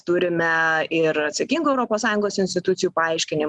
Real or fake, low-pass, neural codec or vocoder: real; 10.8 kHz; none